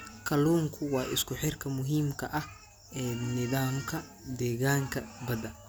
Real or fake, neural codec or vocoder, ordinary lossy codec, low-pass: real; none; none; none